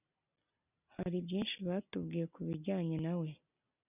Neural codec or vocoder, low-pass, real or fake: none; 3.6 kHz; real